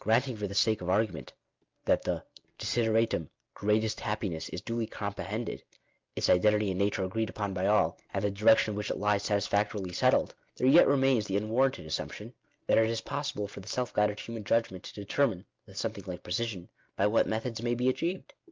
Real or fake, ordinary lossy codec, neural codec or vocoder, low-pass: real; Opus, 24 kbps; none; 7.2 kHz